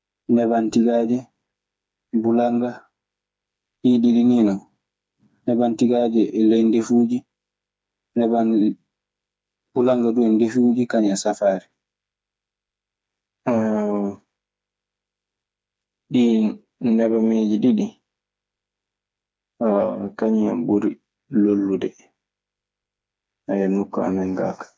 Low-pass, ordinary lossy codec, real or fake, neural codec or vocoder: none; none; fake; codec, 16 kHz, 4 kbps, FreqCodec, smaller model